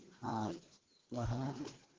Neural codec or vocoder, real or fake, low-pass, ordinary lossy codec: codec, 16 kHz, 2 kbps, FreqCodec, larger model; fake; 7.2 kHz; Opus, 16 kbps